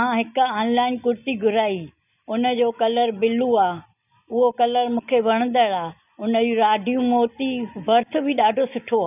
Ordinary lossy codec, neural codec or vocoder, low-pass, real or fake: none; none; 3.6 kHz; real